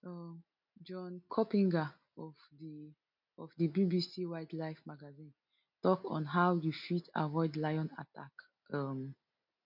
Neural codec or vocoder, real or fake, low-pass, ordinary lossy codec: none; real; 5.4 kHz; none